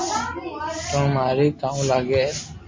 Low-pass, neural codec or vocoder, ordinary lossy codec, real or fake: 7.2 kHz; none; MP3, 32 kbps; real